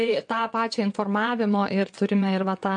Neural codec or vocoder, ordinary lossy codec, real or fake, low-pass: vocoder, 22.05 kHz, 80 mel bands, WaveNeXt; MP3, 48 kbps; fake; 9.9 kHz